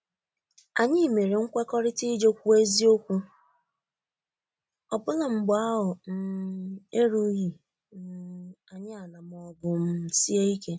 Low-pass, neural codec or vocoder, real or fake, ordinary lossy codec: none; none; real; none